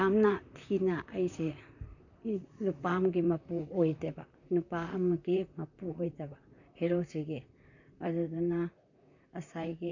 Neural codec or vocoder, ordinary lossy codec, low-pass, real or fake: vocoder, 44.1 kHz, 128 mel bands, Pupu-Vocoder; none; 7.2 kHz; fake